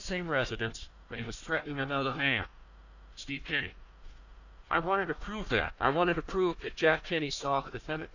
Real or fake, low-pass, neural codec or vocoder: fake; 7.2 kHz; codec, 16 kHz, 1 kbps, FunCodec, trained on Chinese and English, 50 frames a second